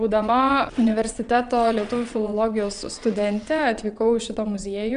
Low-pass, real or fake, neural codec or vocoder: 9.9 kHz; fake; vocoder, 22.05 kHz, 80 mel bands, WaveNeXt